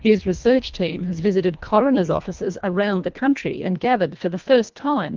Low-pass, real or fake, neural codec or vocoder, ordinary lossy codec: 7.2 kHz; fake; codec, 24 kHz, 1.5 kbps, HILCodec; Opus, 32 kbps